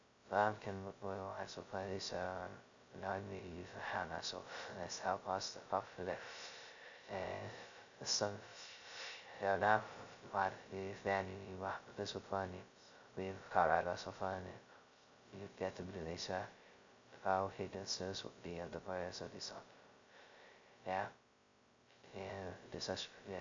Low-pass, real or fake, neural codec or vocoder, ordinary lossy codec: 7.2 kHz; fake; codec, 16 kHz, 0.2 kbps, FocalCodec; AAC, 64 kbps